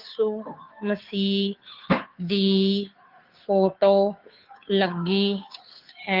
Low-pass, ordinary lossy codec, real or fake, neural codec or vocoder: 5.4 kHz; Opus, 32 kbps; fake; codec, 16 kHz, 2 kbps, FunCodec, trained on Chinese and English, 25 frames a second